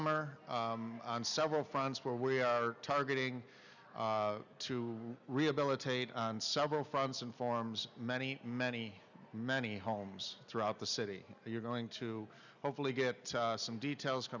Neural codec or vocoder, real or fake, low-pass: none; real; 7.2 kHz